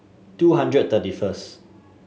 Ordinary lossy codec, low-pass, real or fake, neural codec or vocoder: none; none; real; none